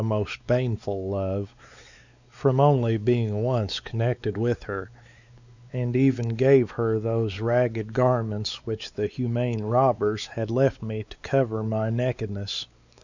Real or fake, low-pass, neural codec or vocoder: fake; 7.2 kHz; codec, 16 kHz, 4 kbps, X-Codec, WavLM features, trained on Multilingual LibriSpeech